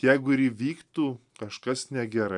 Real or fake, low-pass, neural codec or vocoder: real; 10.8 kHz; none